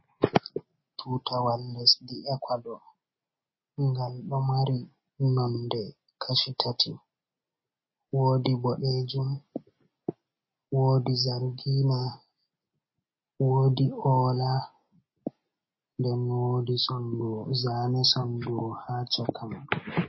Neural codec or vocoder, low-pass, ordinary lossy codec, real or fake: none; 7.2 kHz; MP3, 24 kbps; real